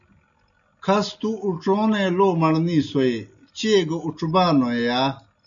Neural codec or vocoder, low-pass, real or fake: none; 7.2 kHz; real